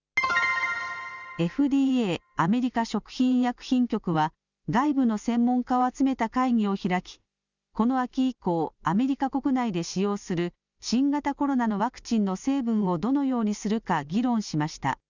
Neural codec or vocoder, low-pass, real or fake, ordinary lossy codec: none; 7.2 kHz; real; none